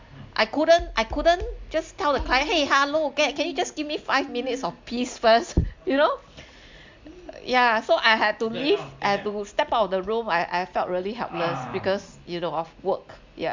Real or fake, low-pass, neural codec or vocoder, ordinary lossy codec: real; 7.2 kHz; none; MP3, 64 kbps